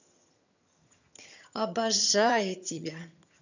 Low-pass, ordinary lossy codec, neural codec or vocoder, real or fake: 7.2 kHz; none; vocoder, 22.05 kHz, 80 mel bands, HiFi-GAN; fake